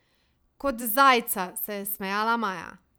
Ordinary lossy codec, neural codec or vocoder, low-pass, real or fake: none; vocoder, 44.1 kHz, 128 mel bands every 512 samples, BigVGAN v2; none; fake